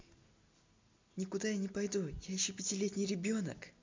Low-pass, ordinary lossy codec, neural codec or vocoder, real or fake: 7.2 kHz; MP3, 64 kbps; none; real